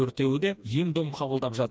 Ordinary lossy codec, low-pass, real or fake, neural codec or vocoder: none; none; fake; codec, 16 kHz, 2 kbps, FreqCodec, smaller model